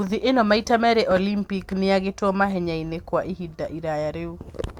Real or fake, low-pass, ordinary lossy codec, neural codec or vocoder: real; 19.8 kHz; Opus, 64 kbps; none